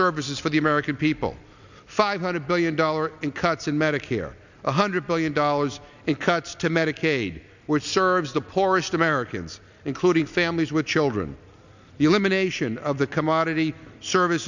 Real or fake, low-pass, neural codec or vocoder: real; 7.2 kHz; none